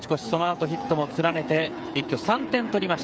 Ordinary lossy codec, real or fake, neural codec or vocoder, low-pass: none; fake; codec, 16 kHz, 8 kbps, FreqCodec, smaller model; none